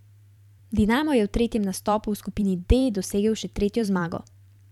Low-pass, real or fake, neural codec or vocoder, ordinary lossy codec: 19.8 kHz; fake; vocoder, 44.1 kHz, 128 mel bands every 512 samples, BigVGAN v2; none